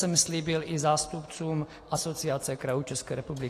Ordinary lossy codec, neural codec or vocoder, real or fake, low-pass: AAC, 48 kbps; none; real; 14.4 kHz